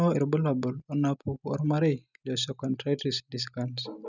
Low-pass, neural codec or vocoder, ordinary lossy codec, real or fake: 7.2 kHz; none; none; real